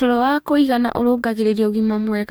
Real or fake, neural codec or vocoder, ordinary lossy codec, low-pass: fake; codec, 44.1 kHz, 2.6 kbps, DAC; none; none